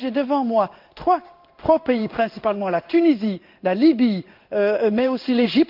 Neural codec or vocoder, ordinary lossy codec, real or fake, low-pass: codec, 16 kHz in and 24 kHz out, 1 kbps, XY-Tokenizer; Opus, 24 kbps; fake; 5.4 kHz